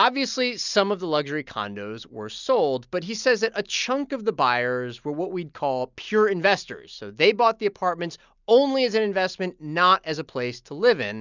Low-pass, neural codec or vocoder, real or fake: 7.2 kHz; none; real